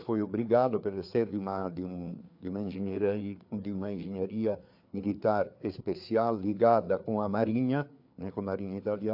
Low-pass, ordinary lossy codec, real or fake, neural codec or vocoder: 5.4 kHz; AAC, 48 kbps; fake; codec, 16 kHz, 4 kbps, FreqCodec, larger model